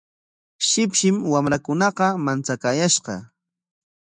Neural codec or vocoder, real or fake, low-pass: autoencoder, 48 kHz, 128 numbers a frame, DAC-VAE, trained on Japanese speech; fake; 9.9 kHz